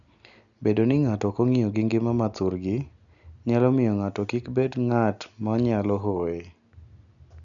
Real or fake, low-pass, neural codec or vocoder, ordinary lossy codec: real; 7.2 kHz; none; none